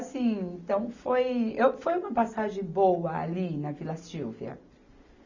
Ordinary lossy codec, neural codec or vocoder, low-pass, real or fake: none; none; 7.2 kHz; real